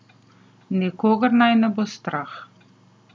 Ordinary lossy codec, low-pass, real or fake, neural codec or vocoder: none; none; real; none